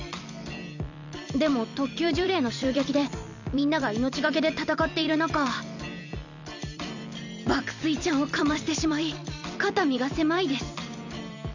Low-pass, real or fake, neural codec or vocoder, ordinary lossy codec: 7.2 kHz; fake; vocoder, 44.1 kHz, 128 mel bands every 256 samples, BigVGAN v2; none